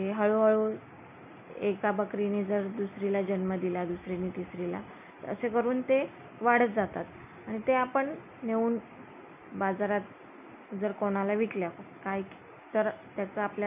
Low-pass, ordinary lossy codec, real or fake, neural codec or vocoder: 3.6 kHz; none; real; none